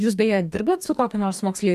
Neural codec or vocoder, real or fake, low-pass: codec, 44.1 kHz, 2.6 kbps, DAC; fake; 14.4 kHz